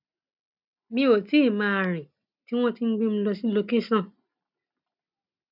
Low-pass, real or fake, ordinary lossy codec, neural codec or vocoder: 5.4 kHz; real; none; none